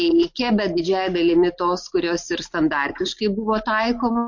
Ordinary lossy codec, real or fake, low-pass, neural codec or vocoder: MP3, 48 kbps; real; 7.2 kHz; none